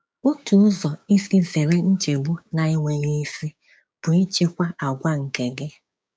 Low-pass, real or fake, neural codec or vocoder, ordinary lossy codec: none; fake; codec, 16 kHz, 6 kbps, DAC; none